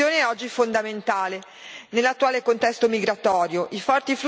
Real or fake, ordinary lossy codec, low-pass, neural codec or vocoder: real; none; none; none